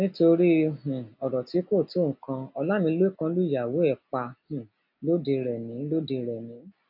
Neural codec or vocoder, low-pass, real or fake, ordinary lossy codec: none; 5.4 kHz; real; AAC, 48 kbps